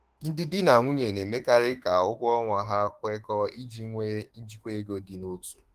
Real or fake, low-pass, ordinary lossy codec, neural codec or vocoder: fake; 14.4 kHz; Opus, 24 kbps; autoencoder, 48 kHz, 32 numbers a frame, DAC-VAE, trained on Japanese speech